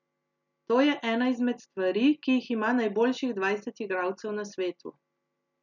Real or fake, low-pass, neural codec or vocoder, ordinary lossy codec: real; 7.2 kHz; none; none